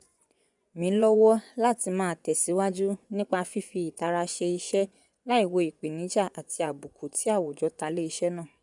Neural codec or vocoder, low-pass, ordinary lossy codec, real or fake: vocoder, 24 kHz, 100 mel bands, Vocos; 10.8 kHz; none; fake